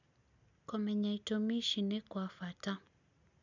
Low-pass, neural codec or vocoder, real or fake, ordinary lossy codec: 7.2 kHz; none; real; none